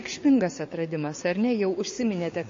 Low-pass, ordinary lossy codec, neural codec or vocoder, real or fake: 7.2 kHz; MP3, 32 kbps; codec, 16 kHz, 6 kbps, DAC; fake